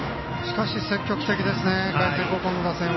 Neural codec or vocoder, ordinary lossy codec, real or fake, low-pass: none; MP3, 24 kbps; real; 7.2 kHz